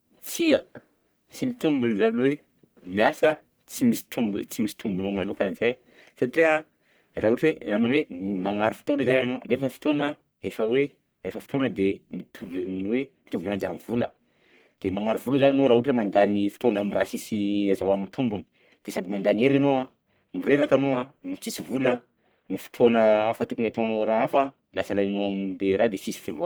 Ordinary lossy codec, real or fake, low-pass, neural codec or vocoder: none; fake; none; codec, 44.1 kHz, 1.7 kbps, Pupu-Codec